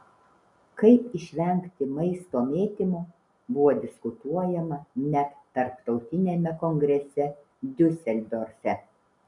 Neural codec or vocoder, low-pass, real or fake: none; 10.8 kHz; real